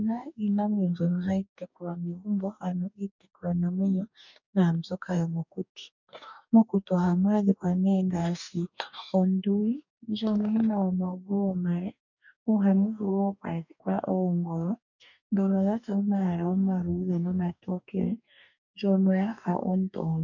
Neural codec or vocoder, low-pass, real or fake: codec, 44.1 kHz, 2.6 kbps, DAC; 7.2 kHz; fake